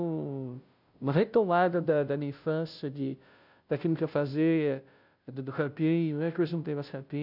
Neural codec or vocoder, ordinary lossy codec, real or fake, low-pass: codec, 16 kHz, 0.5 kbps, FunCodec, trained on Chinese and English, 25 frames a second; none; fake; 5.4 kHz